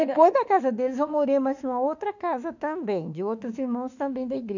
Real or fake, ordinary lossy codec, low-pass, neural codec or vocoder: fake; none; 7.2 kHz; autoencoder, 48 kHz, 32 numbers a frame, DAC-VAE, trained on Japanese speech